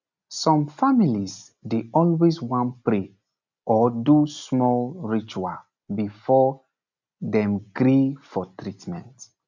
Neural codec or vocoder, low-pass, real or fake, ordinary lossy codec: none; 7.2 kHz; real; none